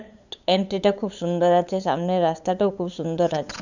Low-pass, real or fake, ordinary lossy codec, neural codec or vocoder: 7.2 kHz; fake; none; codec, 16 kHz, 8 kbps, FunCodec, trained on LibriTTS, 25 frames a second